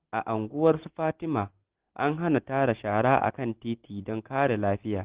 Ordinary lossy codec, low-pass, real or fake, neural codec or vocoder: Opus, 16 kbps; 3.6 kHz; real; none